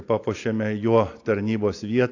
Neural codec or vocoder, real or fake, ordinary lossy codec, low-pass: none; real; AAC, 48 kbps; 7.2 kHz